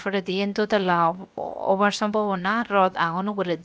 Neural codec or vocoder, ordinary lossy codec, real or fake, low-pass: codec, 16 kHz, 0.7 kbps, FocalCodec; none; fake; none